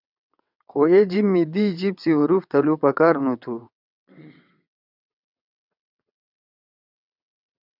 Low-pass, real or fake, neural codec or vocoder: 5.4 kHz; fake; vocoder, 22.05 kHz, 80 mel bands, WaveNeXt